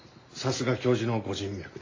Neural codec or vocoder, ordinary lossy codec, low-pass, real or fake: none; AAC, 32 kbps; 7.2 kHz; real